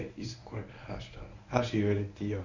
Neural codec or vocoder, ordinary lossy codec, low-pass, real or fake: codec, 24 kHz, 0.9 kbps, WavTokenizer, medium speech release version 1; none; 7.2 kHz; fake